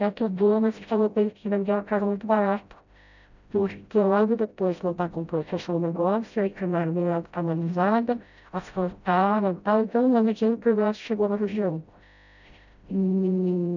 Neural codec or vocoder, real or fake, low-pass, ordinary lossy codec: codec, 16 kHz, 0.5 kbps, FreqCodec, smaller model; fake; 7.2 kHz; none